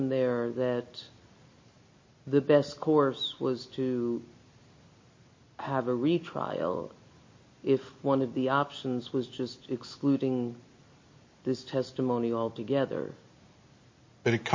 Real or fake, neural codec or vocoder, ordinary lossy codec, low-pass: real; none; MP3, 32 kbps; 7.2 kHz